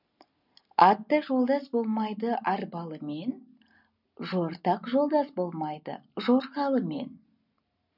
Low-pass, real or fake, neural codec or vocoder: 5.4 kHz; real; none